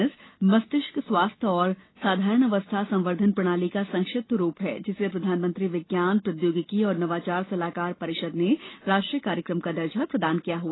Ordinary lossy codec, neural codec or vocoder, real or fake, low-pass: AAC, 16 kbps; none; real; 7.2 kHz